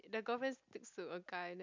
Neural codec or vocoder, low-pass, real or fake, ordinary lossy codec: none; 7.2 kHz; real; MP3, 64 kbps